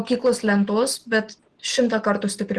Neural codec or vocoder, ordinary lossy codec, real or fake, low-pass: none; Opus, 16 kbps; real; 10.8 kHz